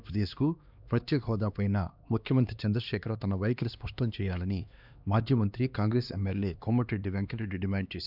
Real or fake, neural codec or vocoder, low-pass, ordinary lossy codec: fake; codec, 16 kHz, 2 kbps, X-Codec, HuBERT features, trained on LibriSpeech; 5.4 kHz; none